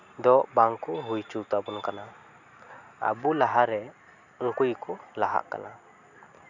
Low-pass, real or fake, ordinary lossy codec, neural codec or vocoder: 7.2 kHz; real; none; none